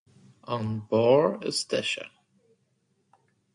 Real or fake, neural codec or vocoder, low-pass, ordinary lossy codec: real; none; 9.9 kHz; AAC, 64 kbps